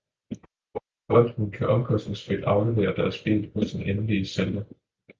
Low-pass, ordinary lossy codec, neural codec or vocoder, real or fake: 7.2 kHz; Opus, 24 kbps; none; real